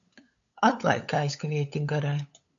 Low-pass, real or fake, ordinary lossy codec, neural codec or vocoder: 7.2 kHz; fake; AAC, 64 kbps; codec, 16 kHz, 8 kbps, FunCodec, trained on LibriTTS, 25 frames a second